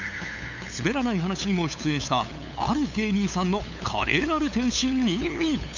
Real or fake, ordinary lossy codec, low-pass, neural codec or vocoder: fake; none; 7.2 kHz; codec, 16 kHz, 8 kbps, FunCodec, trained on LibriTTS, 25 frames a second